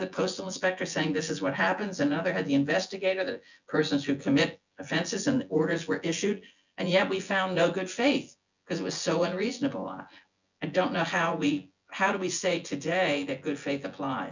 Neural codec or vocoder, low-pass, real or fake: vocoder, 24 kHz, 100 mel bands, Vocos; 7.2 kHz; fake